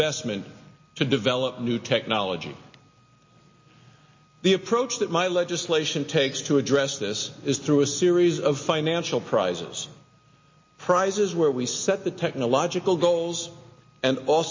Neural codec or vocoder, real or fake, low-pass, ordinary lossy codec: none; real; 7.2 kHz; MP3, 32 kbps